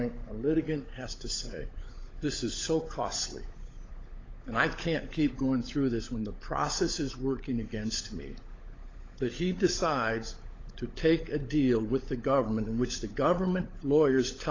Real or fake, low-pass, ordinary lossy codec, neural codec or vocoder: fake; 7.2 kHz; AAC, 32 kbps; codec, 16 kHz, 16 kbps, FunCodec, trained on LibriTTS, 50 frames a second